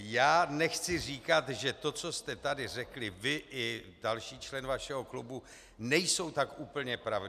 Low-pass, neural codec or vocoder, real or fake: 14.4 kHz; none; real